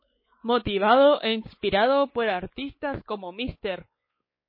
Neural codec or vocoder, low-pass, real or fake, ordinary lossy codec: codec, 16 kHz, 4 kbps, X-Codec, WavLM features, trained on Multilingual LibriSpeech; 5.4 kHz; fake; MP3, 24 kbps